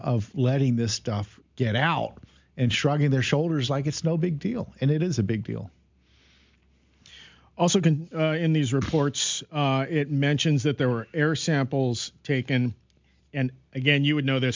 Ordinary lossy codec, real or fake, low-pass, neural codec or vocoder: MP3, 64 kbps; real; 7.2 kHz; none